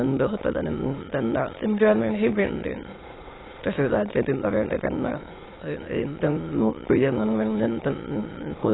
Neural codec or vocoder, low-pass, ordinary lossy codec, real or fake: autoencoder, 22.05 kHz, a latent of 192 numbers a frame, VITS, trained on many speakers; 7.2 kHz; AAC, 16 kbps; fake